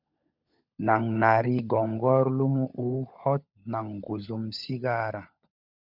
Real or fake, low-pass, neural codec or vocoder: fake; 5.4 kHz; codec, 16 kHz, 16 kbps, FunCodec, trained on LibriTTS, 50 frames a second